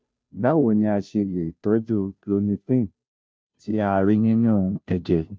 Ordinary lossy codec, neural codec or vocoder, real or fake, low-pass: none; codec, 16 kHz, 0.5 kbps, FunCodec, trained on Chinese and English, 25 frames a second; fake; none